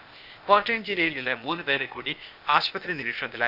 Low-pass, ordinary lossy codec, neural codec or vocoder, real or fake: 5.4 kHz; none; codec, 16 kHz, 0.8 kbps, ZipCodec; fake